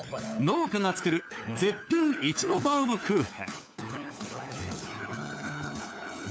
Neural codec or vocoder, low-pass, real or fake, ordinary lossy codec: codec, 16 kHz, 4 kbps, FunCodec, trained on LibriTTS, 50 frames a second; none; fake; none